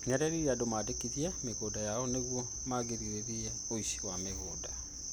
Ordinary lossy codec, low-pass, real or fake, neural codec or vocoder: none; none; real; none